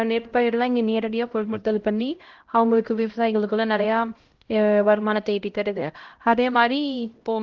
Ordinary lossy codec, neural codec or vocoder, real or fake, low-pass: Opus, 16 kbps; codec, 16 kHz, 0.5 kbps, X-Codec, HuBERT features, trained on LibriSpeech; fake; 7.2 kHz